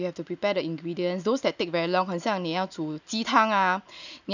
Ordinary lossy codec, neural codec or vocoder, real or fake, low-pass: none; none; real; 7.2 kHz